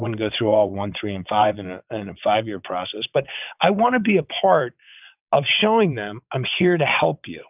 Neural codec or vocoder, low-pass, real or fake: vocoder, 22.05 kHz, 80 mel bands, WaveNeXt; 3.6 kHz; fake